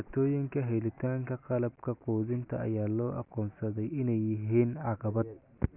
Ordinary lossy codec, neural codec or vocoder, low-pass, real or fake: none; none; 3.6 kHz; real